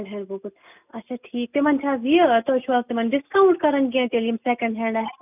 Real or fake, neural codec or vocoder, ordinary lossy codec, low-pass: real; none; none; 3.6 kHz